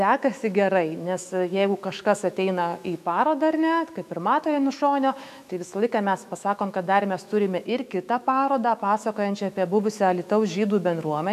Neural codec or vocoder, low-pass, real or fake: autoencoder, 48 kHz, 128 numbers a frame, DAC-VAE, trained on Japanese speech; 14.4 kHz; fake